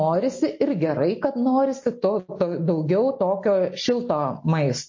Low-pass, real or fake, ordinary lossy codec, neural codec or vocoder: 7.2 kHz; real; MP3, 32 kbps; none